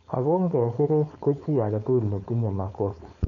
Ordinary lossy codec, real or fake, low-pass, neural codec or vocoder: none; fake; 7.2 kHz; codec, 16 kHz, 4.8 kbps, FACodec